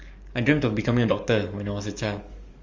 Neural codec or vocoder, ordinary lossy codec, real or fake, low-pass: codec, 44.1 kHz, 7.8 kbps, DAC; Opus, 32 kbps; fake; 7.2 kHz